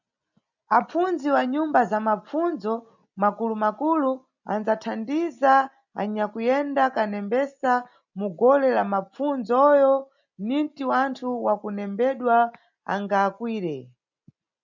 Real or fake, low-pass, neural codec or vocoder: real; 7.2 kHz; none